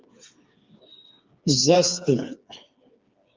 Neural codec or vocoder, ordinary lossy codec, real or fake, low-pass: codec, 16 kHz, 4 kbps, FreqCodec, smaller model; Opus, 24 kbps; fake; 7.2 kHz